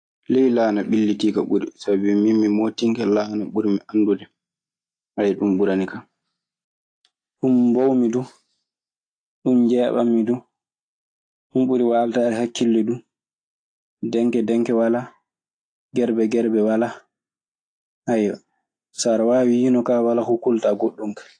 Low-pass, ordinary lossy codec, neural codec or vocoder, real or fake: 9.9 kHz; AAC, 48 kbps; none; real